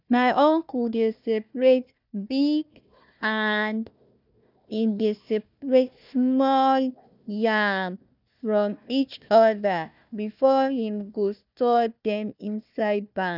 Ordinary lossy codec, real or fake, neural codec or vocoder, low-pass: none; fake; codec, 16 kHz, 1 kbps, FunCodec, trained on Chinese and English, 50 frames a second; 5.4 kHz